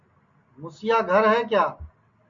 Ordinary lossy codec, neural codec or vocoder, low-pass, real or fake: MP3, 48 kbps; none; 7.2 kHz; real